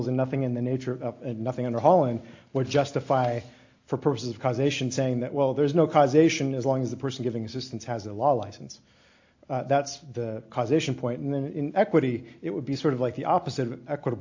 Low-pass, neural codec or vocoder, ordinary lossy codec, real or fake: 7.2 kHz; none; AAC, 48 kbps; real